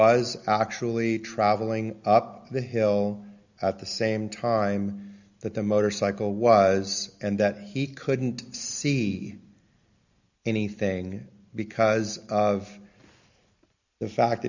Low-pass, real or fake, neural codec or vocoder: 7.2 kHz; real; none